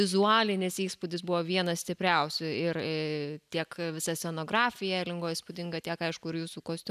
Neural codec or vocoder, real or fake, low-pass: none; real; 14.4 kHz